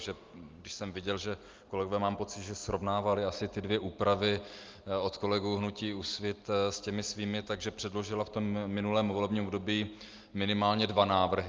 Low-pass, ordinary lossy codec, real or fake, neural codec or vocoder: 7.2 kHz; Opus, 32 kbps; real; none